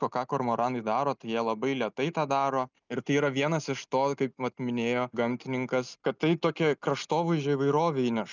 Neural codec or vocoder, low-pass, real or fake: none; 7.2 kHz; real